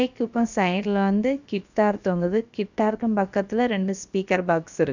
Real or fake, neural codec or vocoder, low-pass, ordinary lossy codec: fake; codec, 16 kHz, about 1 kbps, DyCAST, with the encoder's durations; 7.2 kHz; none